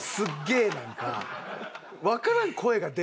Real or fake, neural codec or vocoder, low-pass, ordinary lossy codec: real; none; none; none